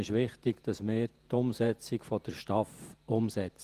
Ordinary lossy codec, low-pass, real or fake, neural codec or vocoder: Opus, 24 kbps; 14.4 kHz; fake; vocoder, 48 kHz, 128 mel bands, Vocos